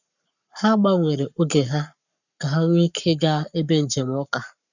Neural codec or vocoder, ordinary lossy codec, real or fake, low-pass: codec, 44.1 kHz, 7.8 kbps, Pupu-Codec; none; fake; 7.2 kHz